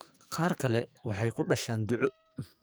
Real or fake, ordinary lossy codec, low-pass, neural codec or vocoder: fake; none; none; codec, 44.1 kHz, 2.6 kbps, SNAC